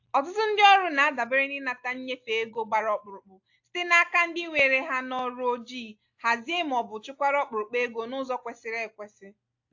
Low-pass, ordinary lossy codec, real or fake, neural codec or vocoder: 7.2 kHz; AAC, 48 kbps; real; none